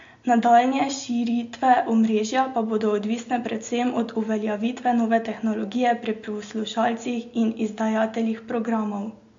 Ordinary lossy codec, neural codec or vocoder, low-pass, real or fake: MP3, 48 kbps; none; 7.2 kHz; real